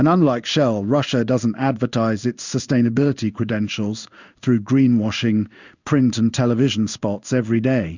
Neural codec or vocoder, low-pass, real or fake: codec, 16 kHz in and 24 kHz out, 1 kbps, XY-Tokenizer; 7.2 kHz; fake